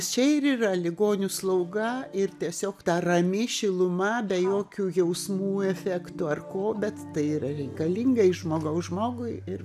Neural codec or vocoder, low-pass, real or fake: none; 14.4 kHz; real